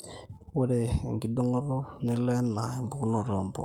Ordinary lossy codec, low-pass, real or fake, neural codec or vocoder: none; 19.8 kHz; fake; codec, 44.1 kHz, 7.8 kbps, Pupu-Codec